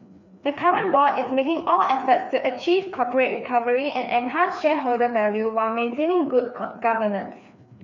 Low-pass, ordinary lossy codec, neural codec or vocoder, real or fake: 7.2 kHz; none; codec, 16 kHz, 2 kbps, FreqCodec, larger model; fake